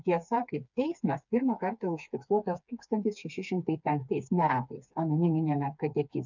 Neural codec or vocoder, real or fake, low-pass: codec, 16 kHz, 4 kbps, FreqCodec, smaller model; fake; 7.2 kHz